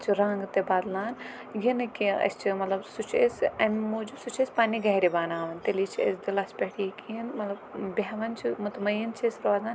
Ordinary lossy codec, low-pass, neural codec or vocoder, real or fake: none; none; none; real